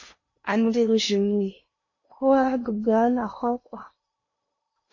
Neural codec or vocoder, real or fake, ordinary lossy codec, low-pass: codec, 16 kHz in and 24 kHz out, 0.8 kbps, FocalCodec, streaming, 65536 codes; fake; MP3, 32 kbps; 7.2 kHz